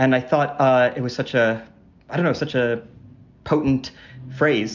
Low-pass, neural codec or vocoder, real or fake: 7.2 kHz; none; real